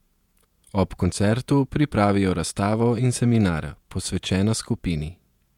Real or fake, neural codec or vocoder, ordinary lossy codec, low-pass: fake; vocoder, 48 kHz, 128 mel bands, Vocos; MP3, 96 kbps; 19.8 kHz